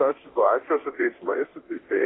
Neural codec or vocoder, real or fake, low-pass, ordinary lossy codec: codec, 24 kHz, 0.9 kbps, DualCodec; fake; 7.2 kHz; AAC, 16 kbps